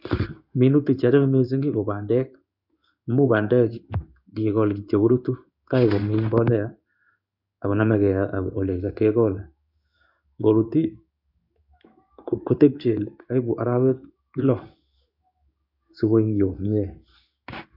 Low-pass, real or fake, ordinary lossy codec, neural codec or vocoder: 5.4 kHz; fake; none; codec, 16 kHz in and 24 kHz out, 1 kbps, XY-Tokenizer